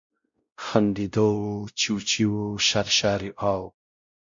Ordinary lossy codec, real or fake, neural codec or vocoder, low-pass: MP3, 48 kbps; fake; codec, 16 kHz, 0.5 kbps, X-Codec, WavLM features, trained on Multilingual LibriSpeech; 7.2 kHz